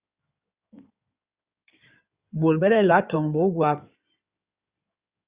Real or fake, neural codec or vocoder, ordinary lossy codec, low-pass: fake; codec, 16 kHz in and 24 kHz out, 2.2 kbps, FireRedTTS-2 codec; Opus, 64 kbps; 3.6 kHz